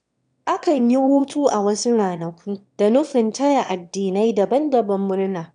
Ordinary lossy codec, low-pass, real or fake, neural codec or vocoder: none; 9.9 kHz; fake; autoencoder, 22.05 kHz, a latent of 192 numbers a frame, VITS, trained on one speaker